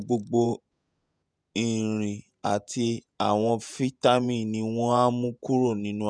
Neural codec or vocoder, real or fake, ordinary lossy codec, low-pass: vocoder, 48 kHz, 128 mel bands, Vocos; fake; none; 9.9 kHz